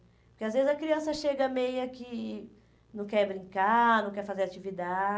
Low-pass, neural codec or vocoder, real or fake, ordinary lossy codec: none; none; real; none